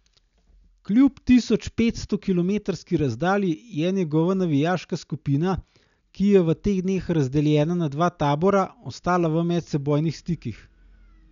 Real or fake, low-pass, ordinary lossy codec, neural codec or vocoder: real; 7.2 kHz; none; none